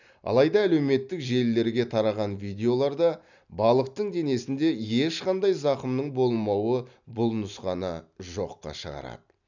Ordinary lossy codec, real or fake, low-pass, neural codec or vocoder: none; real; 7.2 kHz; none